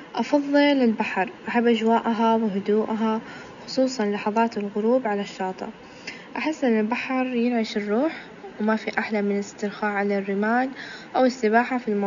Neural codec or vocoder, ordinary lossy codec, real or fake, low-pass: none; none; real; 7.2 kHz